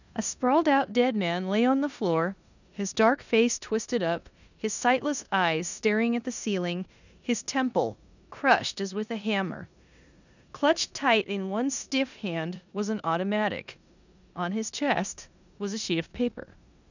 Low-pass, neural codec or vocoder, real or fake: 7.2 kHz; codec, 16 kHz in and 24 kHz out, 0.9 kbps, LongCat-Audio-Codec, four codebook decoder; fake